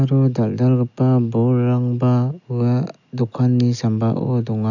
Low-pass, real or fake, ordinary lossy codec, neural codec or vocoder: 7.2 kHz; real; none; none